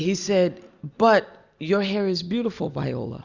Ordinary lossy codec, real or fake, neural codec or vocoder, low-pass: Opus, 64 kbps; real; none; 7.2 kHz